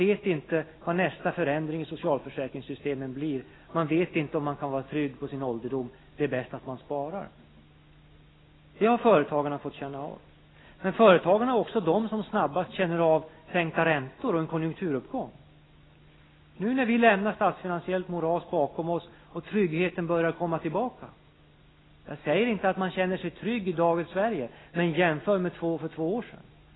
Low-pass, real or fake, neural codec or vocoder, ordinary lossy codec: 7.2 kHz; real; none; AAC, 16 kbps